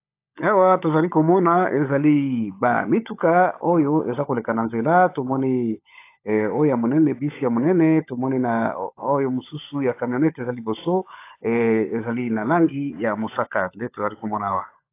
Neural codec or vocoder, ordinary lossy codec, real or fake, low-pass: codec, 16 kHz, 16 kbps, FunCodec, trained on LibriTTS, 50 frames a second; AAC, 24 kbps; fake; 3.6 kHz